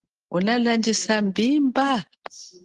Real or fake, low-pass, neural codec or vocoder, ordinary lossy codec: real; 10.8 kHz; none; Opus, 16 kbps